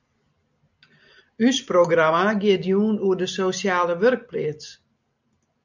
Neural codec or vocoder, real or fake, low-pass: none; real; 7.2 kHz